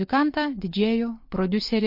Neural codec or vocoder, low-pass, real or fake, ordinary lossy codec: none; 5.4 kHz; real; MP3, 32 kbps